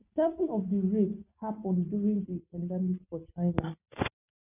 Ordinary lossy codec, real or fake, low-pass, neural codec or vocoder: none; real; 3.6 kHz; none